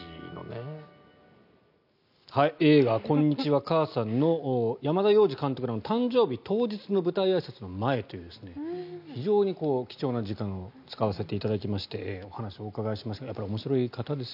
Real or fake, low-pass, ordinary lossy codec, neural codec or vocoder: real; 5.4 kHz; none; none